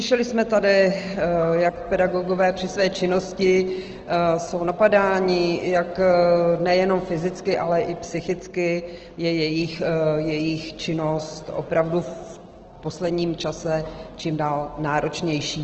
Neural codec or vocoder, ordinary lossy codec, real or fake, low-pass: none; Opus, 16 kbps; real; 7.2 kHz